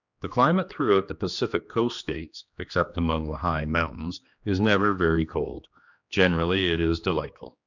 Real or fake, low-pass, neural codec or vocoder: fake; 7.2 kHz; codec, 16 kHz, 2 kbps, X-Codec, HuBERT features, trained on general audio